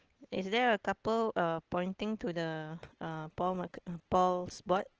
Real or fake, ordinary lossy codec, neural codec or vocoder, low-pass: real; Opus, 16 kbps; none; 7.2 kHz